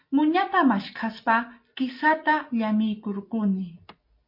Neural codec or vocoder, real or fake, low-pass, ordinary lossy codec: none; real; 5.4 kHz; MP3, 32 kbps